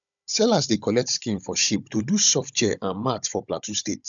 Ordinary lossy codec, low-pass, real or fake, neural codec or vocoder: none; 7.2 kHz; fake; codec, 16 kHz, 16 kbps, FunCodec, trained on Chinese and English, 50 frames a second